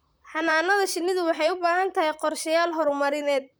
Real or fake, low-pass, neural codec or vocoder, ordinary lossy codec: fake; none; vocoder, 44.1 kHz, 128 mel bands, Pupu-Vocoder; none